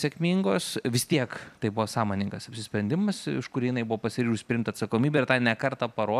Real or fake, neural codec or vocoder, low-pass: fake; vocoder, 44.1 kHz, 128 mel bands every 256 samples, BigVGAN v2; 14.4 kHz